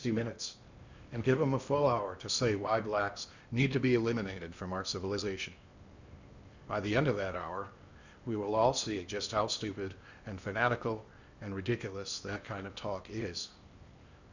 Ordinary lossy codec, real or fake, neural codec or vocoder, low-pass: Opus, 64 kbps; fake; codec, 16 kHz in and 24 kHz out, 0.8 kbps, FocalCodec, streaming, 65536 codes; 7.2 kHz